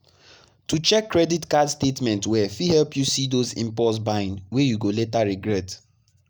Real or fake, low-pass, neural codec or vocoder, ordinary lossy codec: real; none; none; none